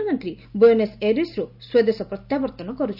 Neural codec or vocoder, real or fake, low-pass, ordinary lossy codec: none; real; 5.4 kHz; none